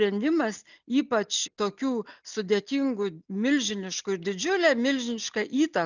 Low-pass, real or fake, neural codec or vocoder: 7.2 kHz; real; none